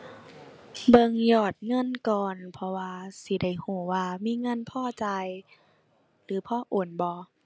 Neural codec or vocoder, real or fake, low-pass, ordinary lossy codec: none; real; none; none